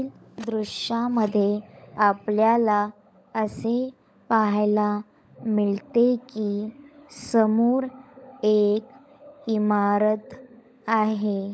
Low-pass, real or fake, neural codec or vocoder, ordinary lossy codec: none; fake; codec, 16 kHz, 16 kbps, FunCodec, trained on LibriTTS, 50 frames a second; none